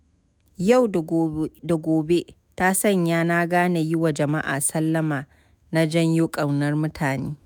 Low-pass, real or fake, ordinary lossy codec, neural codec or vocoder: none; fake; none; autoencoder, 48 kHz, 128 numbers a frame, DAC-VAE, trained on Japanese speech